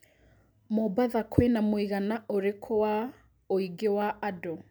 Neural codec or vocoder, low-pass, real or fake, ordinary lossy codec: none; none; real; none